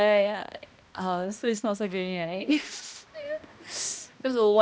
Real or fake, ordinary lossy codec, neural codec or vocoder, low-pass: fake; none; codec, 16 kHz, 1 kbps, X-Codec, HuBERT features, trained on balanced general audio; none